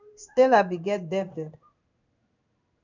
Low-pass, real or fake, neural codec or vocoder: 7.2 kHz; fake; codec, 16 kHz in and 24 kHz out, 1 kbps, XY-Tokenizer